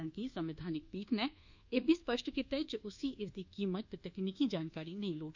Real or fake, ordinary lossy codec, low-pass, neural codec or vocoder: fake; Opus, 64 kbps; 7.2 kHz; codec, 24 kHz, 1.2 kbps, DualCodec